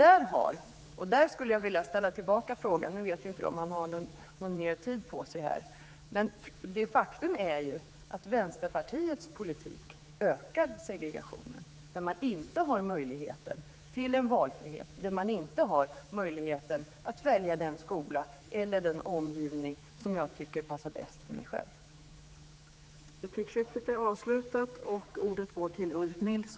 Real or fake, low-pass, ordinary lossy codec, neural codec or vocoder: fake; none; none; codec, 16 kHz, 4 kbps, X-Codec, HuBERT features, trained on general audio